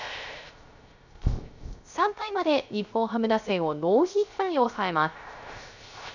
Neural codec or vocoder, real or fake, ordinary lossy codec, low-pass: codec, 16 kHz, 0.3 kbps, FocalCodec; fake; none; 7.2 kHz